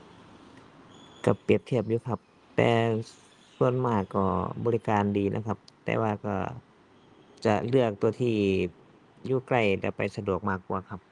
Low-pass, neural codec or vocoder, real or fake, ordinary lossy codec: 10.8 kHz; none; real; Opus, 32 kbps